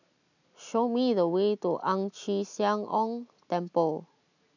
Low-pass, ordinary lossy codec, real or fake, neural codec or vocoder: 7.2 kHz; none; real; none